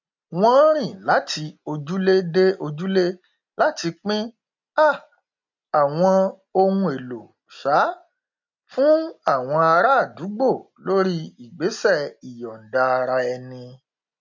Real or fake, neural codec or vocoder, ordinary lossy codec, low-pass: real; none; MP3, 64 kbps; 7.2 kHz